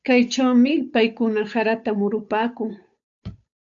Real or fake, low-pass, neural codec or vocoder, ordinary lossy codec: fake; 7.2 kHz; codec, 16 kHz, 8 kbps, FunCodec, trained on Chinese and English, 25 frames a second; AAC, 64 kbps